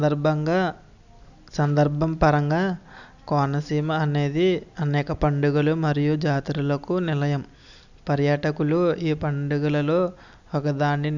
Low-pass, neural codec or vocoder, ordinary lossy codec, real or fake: 7.2 kHz; none; none; real